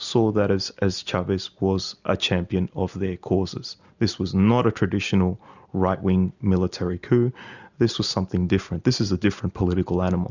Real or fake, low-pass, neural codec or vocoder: real; 7.2 kHz; none